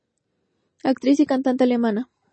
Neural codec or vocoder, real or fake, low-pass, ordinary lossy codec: none; real; 9.9 kHz; MP3, 32 kbps